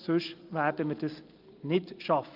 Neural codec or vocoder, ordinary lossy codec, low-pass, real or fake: none; Opus, 24 kbps; 5.4 kHz; real